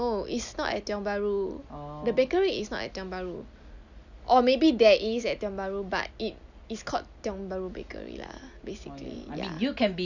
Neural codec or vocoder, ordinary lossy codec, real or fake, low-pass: none; none; real; 7.2 kHz